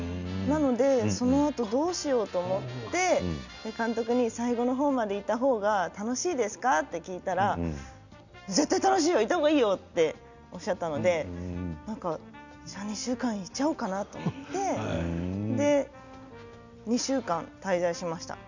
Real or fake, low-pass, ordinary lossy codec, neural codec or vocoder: real; 7.2 kHz; none; none